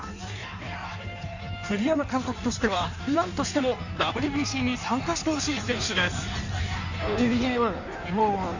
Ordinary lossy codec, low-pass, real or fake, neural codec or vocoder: none; 7.2 kHz; fake; codec, 16 kHz in and 24 kHz out, 1.1 kbps, FireRedTTS-2 codec